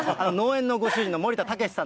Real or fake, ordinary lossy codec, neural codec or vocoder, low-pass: real; none; none; none